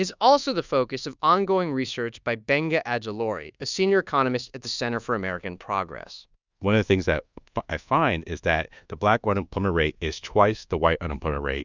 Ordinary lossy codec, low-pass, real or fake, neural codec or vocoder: Opus, 64 kbps; 7.2 kHz; fake; codec, 24 kHz, 1.2 kbps, DualCodec